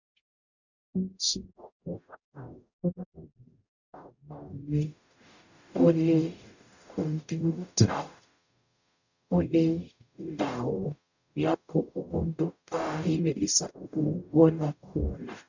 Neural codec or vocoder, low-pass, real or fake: codec, 44.1 kHz, 0.9 kbps, DAC; 7.2 kHz; fake